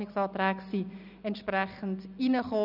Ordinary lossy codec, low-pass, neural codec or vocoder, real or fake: none; 5.4 kHz; none; real